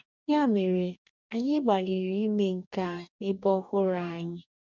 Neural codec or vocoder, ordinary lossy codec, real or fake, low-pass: codec, 44.1 kHz, 2.6 kbps, DAC; none; fake; 7.2 kHz